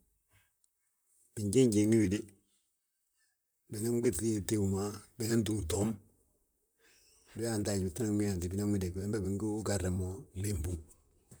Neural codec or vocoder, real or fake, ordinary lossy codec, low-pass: vocoder, 44.1 kHz, 128 mel bands, Pupu-Vocoder; fake; none; none